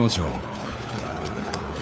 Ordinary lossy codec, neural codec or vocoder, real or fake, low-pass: none; codec, 16 kHz, 4 kbps, FunCodec, trained on LibriTTS, 50 frames a second; fake; none